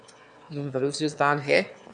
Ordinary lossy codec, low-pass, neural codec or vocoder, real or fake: MP3, 96 kbps; 9.9 kHz; autoencoder, 22.05 kHz, a latent of 192 numbers a frame, VITS, trained on one speaker; fake